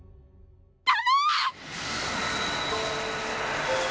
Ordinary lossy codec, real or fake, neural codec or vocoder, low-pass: none; real; none; none